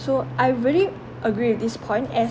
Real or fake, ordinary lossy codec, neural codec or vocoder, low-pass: real; none; none; none